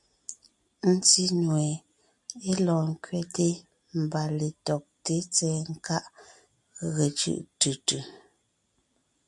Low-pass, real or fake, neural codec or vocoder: 10.8 kHz; real; none